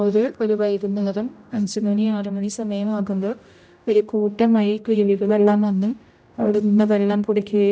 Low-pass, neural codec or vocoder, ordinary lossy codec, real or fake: none; codec, 16 kHz, 0.5 kbps, X-Codec, HuBERT features, trained on general audio; none; fake